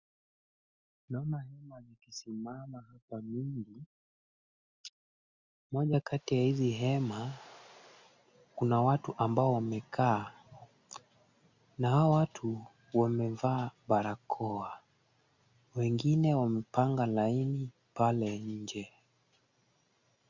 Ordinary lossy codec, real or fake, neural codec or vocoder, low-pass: Opus, 64 kbps; real; none; 7.2 kHz